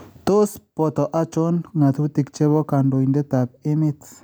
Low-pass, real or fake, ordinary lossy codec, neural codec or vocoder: none; real; none; none